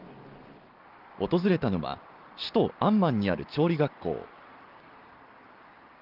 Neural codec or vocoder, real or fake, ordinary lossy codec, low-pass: none; real; Opus, 32 kbps; 5.4 kHz